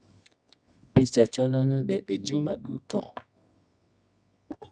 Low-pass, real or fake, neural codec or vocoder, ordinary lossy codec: 9.9 kHz; fake; codec, 24 kHz, 0.9 kbps, WavTokenizer, medium music audio release; none